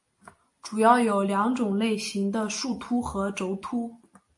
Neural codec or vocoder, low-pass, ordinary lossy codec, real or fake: none; 10.8 kHz; MP3, 48 kbps; real